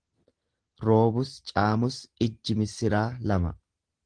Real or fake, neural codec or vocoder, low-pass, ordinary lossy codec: real; none; 9.9 kHz; Opus, 16 kbps